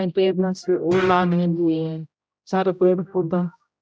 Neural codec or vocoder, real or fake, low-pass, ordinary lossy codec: codec, 16 kHz, 0.5 kbps, X-Codec, HuBERT features, trained on general audio; fake; none; none